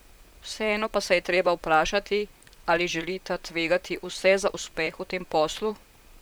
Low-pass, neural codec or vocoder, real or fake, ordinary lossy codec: none; vocoder, 44.1 kHz, 128 mel bands, Pupu-Vocoder; fake; none